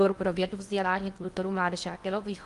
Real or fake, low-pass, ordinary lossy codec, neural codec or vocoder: fake; 10.8 kHz; Opus, 32 kbps; codec, 16 kHz in and 24 kHz out, 0.8 kbps, FocalCodec, streaming, 65536 codes